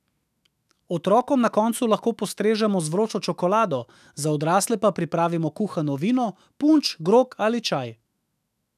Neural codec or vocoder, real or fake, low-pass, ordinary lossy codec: autoencoder, 48 kHz, 128 numbers a frame, DAC-VAE, trained on Japanese speech; fake; 14.4 kHz; none